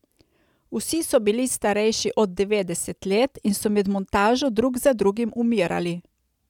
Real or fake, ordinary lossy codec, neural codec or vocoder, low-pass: real; none; none; 19.8 kHz